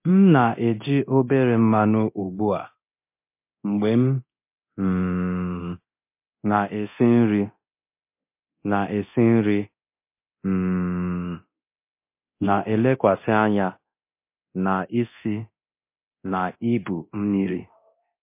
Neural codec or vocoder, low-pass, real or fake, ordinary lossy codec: codec, 24 kHz, 0.9 kbps, DualCodec; 3.6 kHz; fake; MP3, 24 kbps